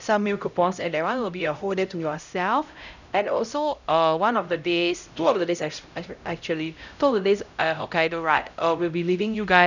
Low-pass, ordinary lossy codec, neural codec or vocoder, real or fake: 7.2 kHz; none; codec, 16 kHz, 0.5 kbps, X-Codec, HuBERT features, trained on LibriSpeech; fake